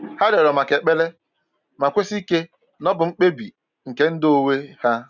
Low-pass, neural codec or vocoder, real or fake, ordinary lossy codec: 7.2 kHz; none; real; none